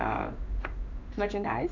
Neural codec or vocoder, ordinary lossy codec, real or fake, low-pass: codec, 16 kHz in and 24 kHz out, 1 kbps, XY-Tokenizer; none; fake; 7.2 kHz